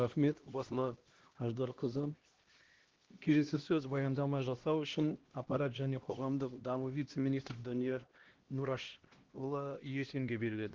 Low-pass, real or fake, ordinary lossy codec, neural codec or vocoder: 7.2 kHz; fake; Opus, 16 kbps; codec, 16 kHz, 1 kbps, X-Codec, HuBERT features, trained on LibriSpeech